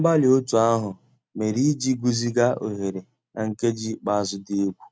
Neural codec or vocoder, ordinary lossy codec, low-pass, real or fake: none; none; none; real